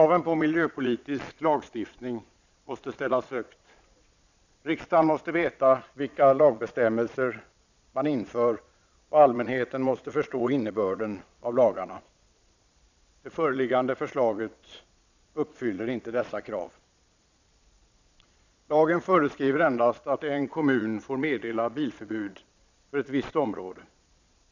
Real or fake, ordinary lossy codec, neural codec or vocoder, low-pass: fake; none; vocoder, 22.05 kHz, 80 mel bands, Vocos; 7.2 kHz